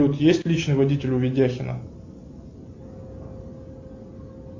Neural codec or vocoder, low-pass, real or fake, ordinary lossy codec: none; 7.2 kHz; real; AAC, 48 kbps